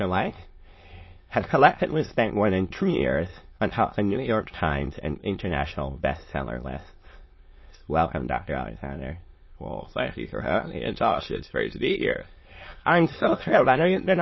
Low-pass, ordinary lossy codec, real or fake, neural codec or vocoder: 7.2 kHz; MP3, 24 kbps; fake; autoencoder, 22.05 kHz, a latent of 192 numbers a frame, VITS, trained on many speakers